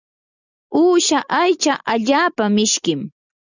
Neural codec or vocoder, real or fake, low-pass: none; real; 7.2 kHz